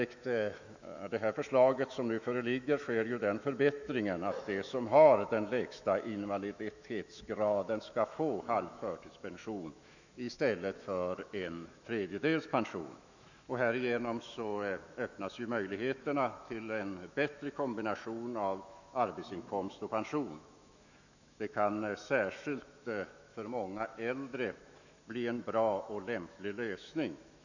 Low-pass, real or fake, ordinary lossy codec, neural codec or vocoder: 7.2 kHz; fake; none; autoencoder, 48 kHz, 128 numbers a frame, DAC-VAE, trained on Japanese speech